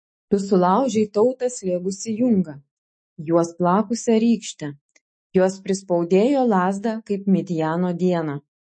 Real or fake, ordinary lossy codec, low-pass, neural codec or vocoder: fake; MP3, 32 kbps; 9.9 kHz; autoencoder, 48 kHz, 128 numbers a frame, DAC-VAE, trained on Japanese speech